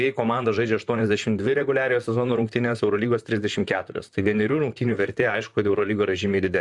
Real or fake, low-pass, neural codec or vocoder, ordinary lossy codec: fake; 10.8 kHz; vocoder, 44.1 kHz, 128 mel bands, Pupu-Vocoder; MP3, 96 kbps